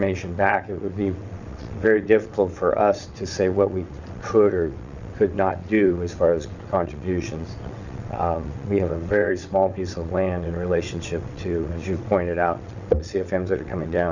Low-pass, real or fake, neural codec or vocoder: 7.2 kHz; fake; vocoder, 22.05 kHz, 80 mel bands, WaveNeXt